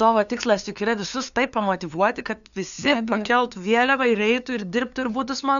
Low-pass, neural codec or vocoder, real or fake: 7.2 kHz; codec, 16 kHz, 2 kbps, FunCodec, trained on LibriTTS, 25 frames a second; fake